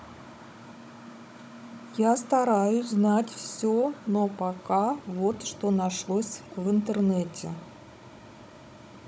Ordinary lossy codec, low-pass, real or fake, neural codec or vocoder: none; none; fake; codec, 16 kHz, 16 kbps, FunCodec, trained on Chinese and English, 50 frames a second